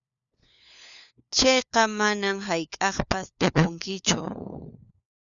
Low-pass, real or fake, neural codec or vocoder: 7.2 kHz; fake; codec, 16 kHz, 4 kbps, FunCodec, trained on LibriTTS, 50 frames a second